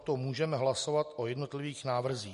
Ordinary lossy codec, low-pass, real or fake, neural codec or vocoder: MP3, 48 kbps; 14.4 kHz; real; none